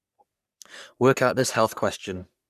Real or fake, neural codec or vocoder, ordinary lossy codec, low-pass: fake; codec, 44.1 kHz, 3.4 kbps, Pupu-Codec; none; 14.4 kHz